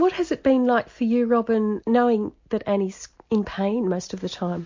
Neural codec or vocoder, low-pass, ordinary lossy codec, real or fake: none; 7.2 kHz; MP3, 48 kbps; real